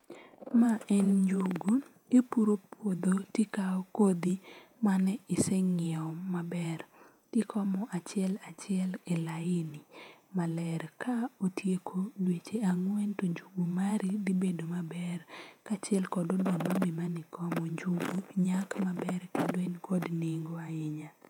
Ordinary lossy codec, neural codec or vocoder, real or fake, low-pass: none; vocoder, 48 kHz, 128 mel bands, Vocos; fake; 19.8 kHz